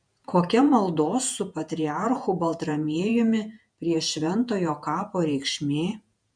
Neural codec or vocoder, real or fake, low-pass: vocoder, 48 kHz, 128 mel bands, Vocos; fake; 9.9 kHz